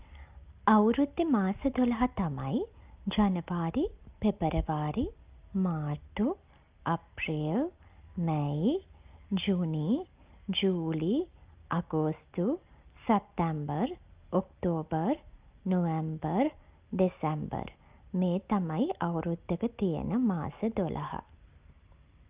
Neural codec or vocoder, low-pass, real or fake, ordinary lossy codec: none; 3.6 kHz; real; Opus, 24 kbps